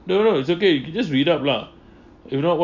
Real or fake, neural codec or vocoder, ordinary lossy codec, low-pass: real; none; none; 7.2 kHz